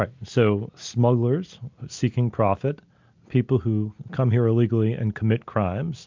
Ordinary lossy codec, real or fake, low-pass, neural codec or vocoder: MP3, 64 kbps; real; 7.2 kHz; none